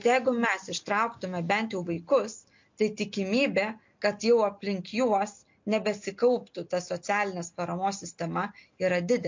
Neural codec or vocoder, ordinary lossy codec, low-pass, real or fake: none; MP3, 48 kbps; 7.2 kHz; real